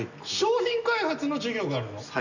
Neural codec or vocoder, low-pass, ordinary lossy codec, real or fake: none; 7.2 kHz; none; real